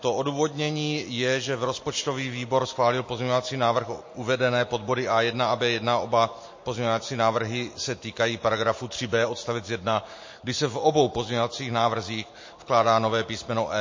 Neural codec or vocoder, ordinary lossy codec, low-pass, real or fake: none; MP3, 32 kbps; 7.2 kHz; real